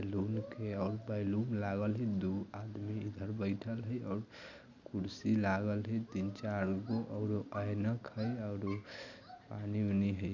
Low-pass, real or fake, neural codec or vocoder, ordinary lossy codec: 7.2 kHz; real; none; none